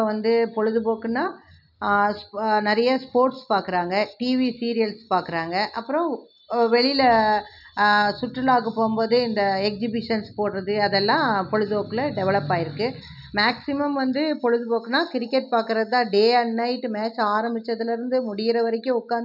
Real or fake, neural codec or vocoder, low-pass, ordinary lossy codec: real; none; 5.4 kHz; none